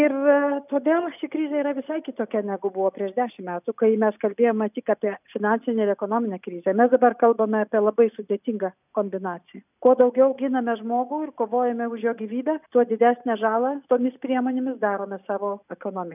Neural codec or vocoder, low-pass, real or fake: none; 3.6 kHz; real